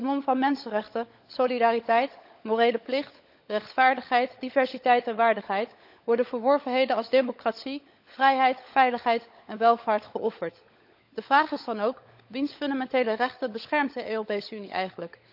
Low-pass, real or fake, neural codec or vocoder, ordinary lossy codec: 5.4 kHz; fake; codec, 16 kHz, 16 kbps, FunCodec, trained on LibriTTS, 50 frames a second; AAC, 48 kbps